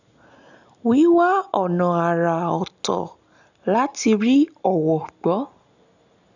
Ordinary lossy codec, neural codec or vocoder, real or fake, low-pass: none; none; real; 7.2 kHz